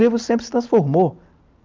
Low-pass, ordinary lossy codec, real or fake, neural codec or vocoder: 7.2 kHz; Opus, 24 kbps; real; none